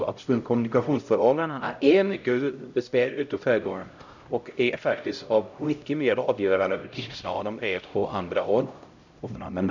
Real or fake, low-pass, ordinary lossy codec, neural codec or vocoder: fake; 7.2 kHz; none; codec, 16 kHz, 0.5 kbps, X-Codec, HuBERT features, trained on LibriSpeech